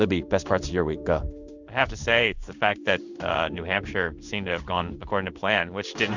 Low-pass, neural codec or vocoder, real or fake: 7.2 kHz; codec, 16 kHz in and 24 kHz out, 1 kbps, XY-Tokenizer; fake